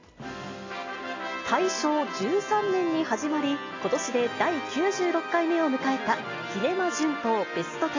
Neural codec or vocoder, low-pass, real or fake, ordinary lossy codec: none; 7.2 kHz; real; AAC, 32 kbps